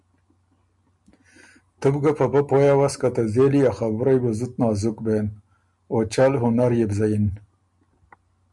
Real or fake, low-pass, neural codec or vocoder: real; 10.8 kHz; none